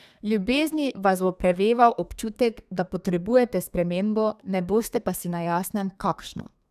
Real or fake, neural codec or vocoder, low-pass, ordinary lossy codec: fake; codec, 32 kHz, 1.9 kbps, SNAC; 14.4 kHz; none